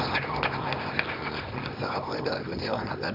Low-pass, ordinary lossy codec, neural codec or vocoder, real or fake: 5.4 kHz; none; codec, 24 kHz, 0.9 kbps, WavTokenizer, small release; fake